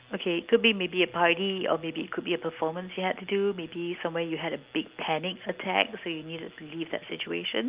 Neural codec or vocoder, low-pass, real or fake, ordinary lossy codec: none; 3.6 kHz; real; Opus, 32 kbps